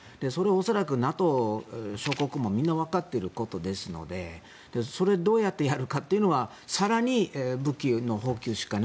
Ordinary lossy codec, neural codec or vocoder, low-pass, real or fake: none; none; none; real